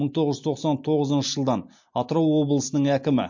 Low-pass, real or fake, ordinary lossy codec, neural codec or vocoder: 7.2 kHz; real; MP3, 48 kbps; none